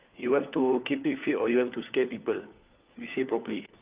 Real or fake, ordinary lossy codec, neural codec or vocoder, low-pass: fake; Opus, 24 kbps; codec, 16 kHz, 4 kbps, FunCodec, trained on LibriTTS, 50 frames a second; 3.6 kHz